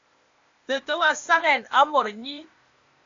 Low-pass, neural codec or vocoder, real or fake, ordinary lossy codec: 7.2 kHz; codec, 16 kHz, 0.8 kbps, ZipCodec; fake; AAC, 48 kbps